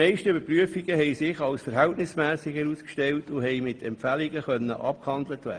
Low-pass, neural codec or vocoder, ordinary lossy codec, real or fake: 9.9 kHz; none; Opus, 24 kbps; real